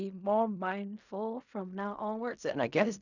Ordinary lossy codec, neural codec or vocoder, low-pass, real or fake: none; codec, 16 kHz in and 24 kHz out, 0.4 kbps, LongCat-Audio-Codec, fine tuned four codebook decoder; 7.2 kHz; fake